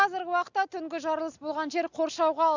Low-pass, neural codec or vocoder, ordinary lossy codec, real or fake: 7.2 kHz; none; none; real